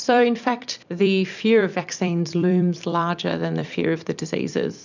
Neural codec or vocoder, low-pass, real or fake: vocoder, 44.1 kHz, 128 mel bands every 256 samples, BigVGAN v2; 7.2 kHz; fake